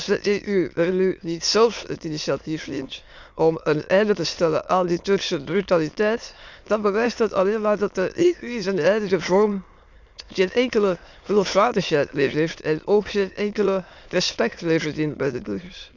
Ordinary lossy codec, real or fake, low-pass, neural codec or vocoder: Opus, 64 kbps; fake; 7.2 kHz; autoencoder, 22.05 kHz, a latent of 192 numbers a frame, VITS, trained on many speakers